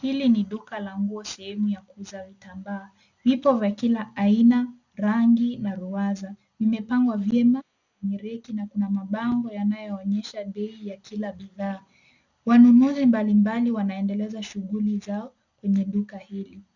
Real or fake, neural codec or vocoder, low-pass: real; none; 7.2 kHz